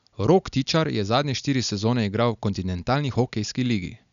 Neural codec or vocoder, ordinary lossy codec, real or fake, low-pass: none; none; real; 7.2 kHz